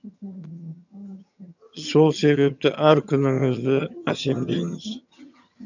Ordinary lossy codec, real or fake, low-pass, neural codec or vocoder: none; fake; 7.2 kHz; vocoder, 22.05 kHz, 80 mel bands, HiFi-GAN